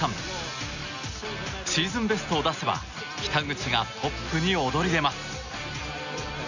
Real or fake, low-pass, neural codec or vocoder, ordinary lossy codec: real; 7.2 kHz; none; none